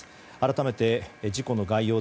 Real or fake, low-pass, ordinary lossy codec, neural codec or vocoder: real; none; none; none